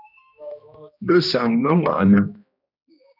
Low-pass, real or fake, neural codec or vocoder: 5.4 kHz; fake; codec, 16 kHz, 1 kbps, X-Codec, HuBERT features, trained on general audio